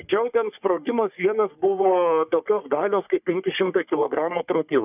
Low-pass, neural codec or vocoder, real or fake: 3.6 kHz; codec, 44.1 kHz, 3.4 kbps, Pupu-Codec; fake